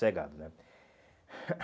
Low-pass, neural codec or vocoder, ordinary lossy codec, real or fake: none; none; none; real